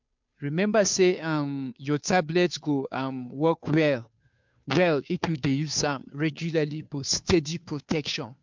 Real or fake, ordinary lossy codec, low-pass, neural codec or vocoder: fake; MP3, 64 kbps; 7.2 kHz; codec, 16 kHz, 2 kbps, FunCodec, trained on Chinese and English, 25 frames a second